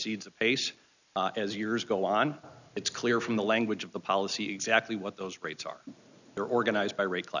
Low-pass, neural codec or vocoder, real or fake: 7.2 kHz; none; real